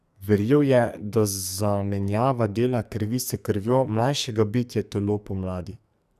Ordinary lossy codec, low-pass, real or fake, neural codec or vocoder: none; 14.4 kHz; fake; codec, 44.1 kHz, 2.6 kbps, SNAC